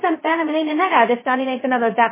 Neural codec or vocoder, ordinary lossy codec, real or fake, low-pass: codec, 16 kHz, 0.2 kbps, FocalCodec; MP3, 16 kbps; fake; 3.6 kHz